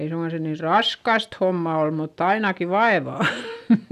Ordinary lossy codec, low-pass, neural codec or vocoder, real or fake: none; 14.4 kHz; none; real